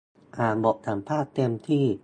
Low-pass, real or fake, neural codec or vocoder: 9.9 kHz; real; none